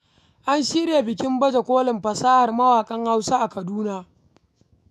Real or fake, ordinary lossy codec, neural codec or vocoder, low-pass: fake; none; autoencoder, 48 kHz, 128 numbers a frame, DAC-VAE, trained on Japanese speech; 14.4 kHz